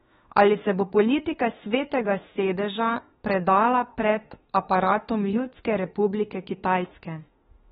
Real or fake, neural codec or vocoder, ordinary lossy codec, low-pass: fake; autoencoder, 48 kHz, 32 numbers a frame, DAC-VAE, trained on Japanese speech; AAC, 16 kbps; 19.8 kHz